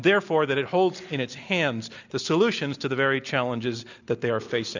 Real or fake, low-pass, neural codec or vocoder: real; 7.2 kHz; none